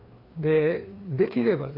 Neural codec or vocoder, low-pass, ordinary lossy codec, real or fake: codec, 16 kHz, 2 kbps, FreqCodec, larger model; 5.4 kHz; AAC, 24 kbps; fake